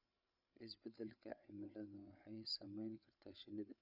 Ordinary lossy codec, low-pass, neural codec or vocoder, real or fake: none; 5.4 kHz; codec, 16 kHz, 8 kbps, FreqCodec, larger model; fake